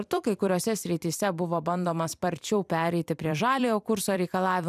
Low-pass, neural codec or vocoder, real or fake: 14.4 kHz; vocoder, 44.1 kHz, 128 mel bands every 256 samples, BigVGAN v2; fake